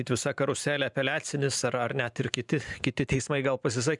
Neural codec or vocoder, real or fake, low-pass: vocoder, 44.1 kHz, 128 mel bands every 512 samples, BigVGAN v2; fake; 10.8 kHz